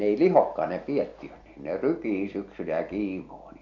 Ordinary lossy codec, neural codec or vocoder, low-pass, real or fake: AAC, 48 kbps; none; 7.2 kHz; real